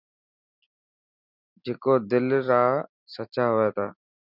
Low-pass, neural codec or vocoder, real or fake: 5.4 kHz; none; real